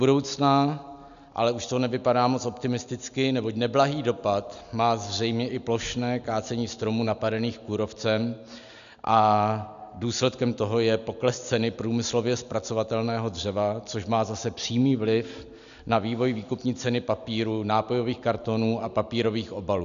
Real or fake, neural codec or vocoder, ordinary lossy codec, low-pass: real; none; MP3, 96 kbps; 7.2 kHz